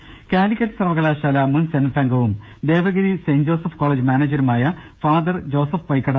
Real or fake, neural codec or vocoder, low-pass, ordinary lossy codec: fake; codec, 16 kHz, 16 kbps, FreqCodec, smaller model; none; none